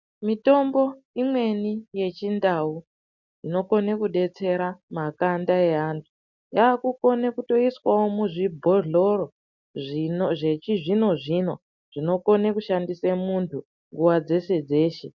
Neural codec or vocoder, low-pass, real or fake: none; 7.2 kHz; real